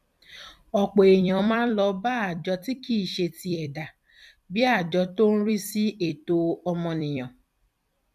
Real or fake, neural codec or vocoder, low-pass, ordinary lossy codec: fake; vocoder, 44.1 kHz, 128 mel bands every 256 samples, BigVGAN v2; 14.4 kHz; none